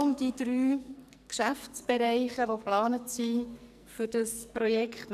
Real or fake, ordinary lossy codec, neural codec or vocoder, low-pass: fake; none; codec, 44.1 kHz, 2.6 kbps, SNAC; 14.4 kHz